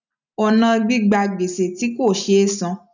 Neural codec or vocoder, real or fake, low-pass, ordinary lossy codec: none; real; 7.2 kHz; none